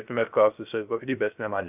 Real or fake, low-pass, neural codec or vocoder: fake; 3.6 kHz; codec, 16 kHz, 0.3 kbps, FocalCodec